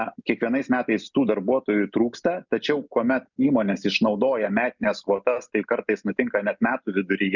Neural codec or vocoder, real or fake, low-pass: none; real; 7.2 kHz